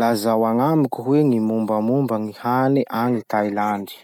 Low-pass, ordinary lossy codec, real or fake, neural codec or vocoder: 19.8 kHz; none; real; none